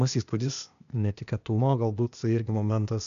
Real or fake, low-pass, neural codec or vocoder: fake; 7.2 kHz; codec, 16 kHz, 0.8 kbps, ZipCodec